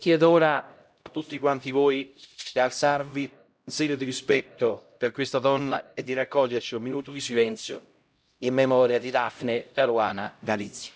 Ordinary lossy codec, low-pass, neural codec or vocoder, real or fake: none; none; codec, 16 kHz, 0.5 kbps, X-Codec, HuBERT features, trained on LibriSpeech; fake